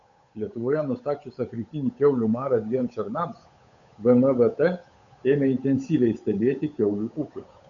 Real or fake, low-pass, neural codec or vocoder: fake; 7.2 kHz; codec, 16 kHz, 8 kbps, FunCodec, trained on Chinese and English, 25 frames a second